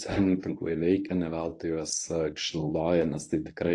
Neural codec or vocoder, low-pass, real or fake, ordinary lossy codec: codec, 24 kHz, 0.9 kbps, WavTokenizer, medium speech release version 1; 10.8 kHz; fake; AAC, 48 kbps